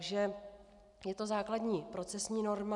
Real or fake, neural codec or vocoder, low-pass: real; none; 10.8 kHz